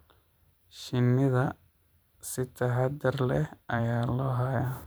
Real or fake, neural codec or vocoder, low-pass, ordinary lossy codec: fake; vocoder, 44.1 kHz, 128 mel bands every 512 samples, BigVGAN v2; none; none